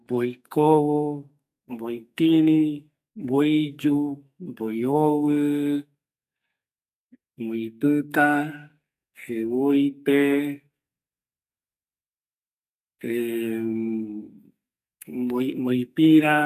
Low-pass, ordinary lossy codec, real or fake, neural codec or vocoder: 14.4 kHz; none; fake; codec, 44.1 kHz, 2.6 kbps, SNAC